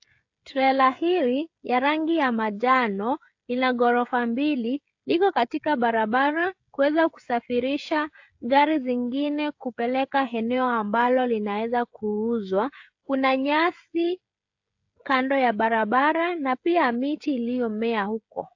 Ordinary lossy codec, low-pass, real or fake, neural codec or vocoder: AAC, 48 kbps; 7.2 kHz; fake; codec, 16 kHz, 16 kbps, FreqCodec, smaller model